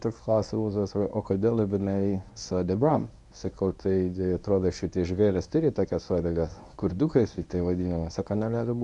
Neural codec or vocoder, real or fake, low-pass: codec, 24 kHz, 0.9 kbps, WavTokenizer, medium speech release version 1; fake; 10.8 kHz